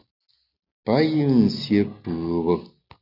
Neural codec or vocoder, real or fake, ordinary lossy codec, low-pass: none; real; AAC, 48 kbps; 5.4 kHz